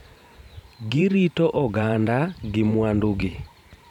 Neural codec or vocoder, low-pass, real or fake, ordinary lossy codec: vocoder, 44.1 kHz, 128 mel bands every 512 samples, BigVGAN v2; 19.8 kHz; fake; none